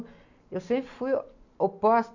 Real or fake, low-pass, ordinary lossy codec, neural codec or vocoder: real; 7.2 kHz; none; none